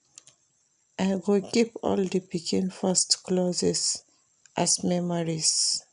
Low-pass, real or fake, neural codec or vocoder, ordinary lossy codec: 9.9 kHz; real; none; none